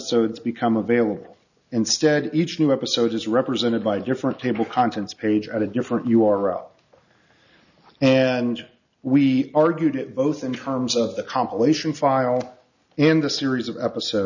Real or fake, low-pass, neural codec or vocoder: real; 7.2 kHz; none